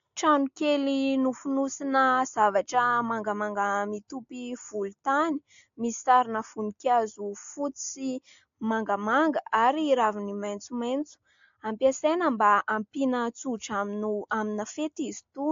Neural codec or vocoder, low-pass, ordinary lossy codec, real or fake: none; 7.2 kHz; AAC, 48 kbps; real